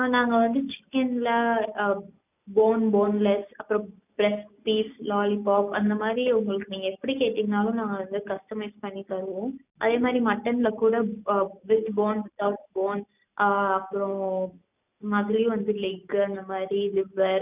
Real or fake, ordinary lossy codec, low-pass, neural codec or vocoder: real; none; 3.6 kHz; none